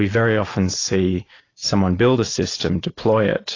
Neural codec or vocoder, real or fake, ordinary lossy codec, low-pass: none; real; AAC, 32 kbps; 7.2 kHz